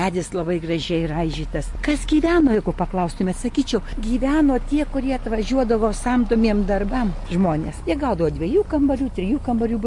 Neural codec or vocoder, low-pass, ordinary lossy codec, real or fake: none; 10.8 kHz; MP3, 48 kbps; real